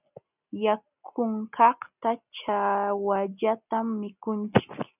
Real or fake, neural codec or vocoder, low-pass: real; none; 3.6 kHz